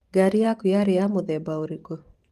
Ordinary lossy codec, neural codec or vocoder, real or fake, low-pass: Opus, 24 kbps; vocoder, 44.1 kHz, 128 mel bands every 512 samples, BigVGAN v2; fake; 14.4 kHz